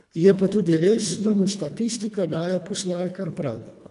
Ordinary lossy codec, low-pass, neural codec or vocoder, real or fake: none; 10.8 kHz; codec, 24 kHz, 1.5 kbps, HILCodec; fake